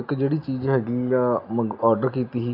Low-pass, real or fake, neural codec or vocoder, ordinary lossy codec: 5.4 kHz; real; none; AAC, 32 kbps